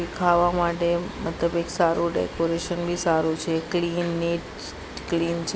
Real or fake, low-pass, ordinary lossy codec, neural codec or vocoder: real; none; none; none